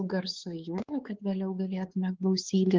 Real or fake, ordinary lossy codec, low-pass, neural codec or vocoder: fake; Opus, 16 kbps; 7.2 kHz; codec, 16 kHz, 4 kbps, X-Codec, WavLM features, trained on Multilingual LibriSpeech